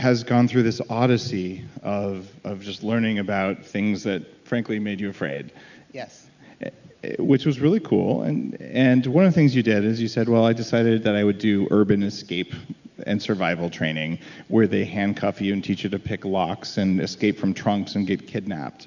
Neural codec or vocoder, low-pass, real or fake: none; 7.2 kHz; real